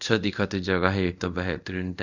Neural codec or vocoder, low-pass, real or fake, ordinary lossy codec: codec, 24 kHz, 0.5 kbps, DualCodec; 7.2 kHz; fake; none